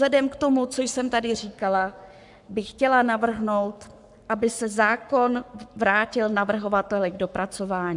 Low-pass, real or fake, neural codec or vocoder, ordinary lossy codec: 10.8 kHz; fake; codec, 44.1 kHz, 7.8 kbps, Pupu-Codec; MP3, 96 kbps